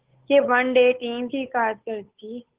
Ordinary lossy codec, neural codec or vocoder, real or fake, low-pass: Opus, 16 kbps; codec, 16 kHz, 8 kbps, FunCodec, trained on Chinese and English, 25 frames a second; fake; 3.6 kHz